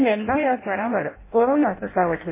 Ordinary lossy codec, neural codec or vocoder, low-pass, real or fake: MP3, 16 kbps; codec, 16 kHz in and 24 kHz out, 0.6 kbps, FireRedTTS-2 codec; 3.6 kHz; fake